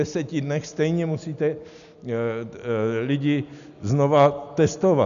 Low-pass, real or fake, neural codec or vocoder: 7.2 kHz; real; none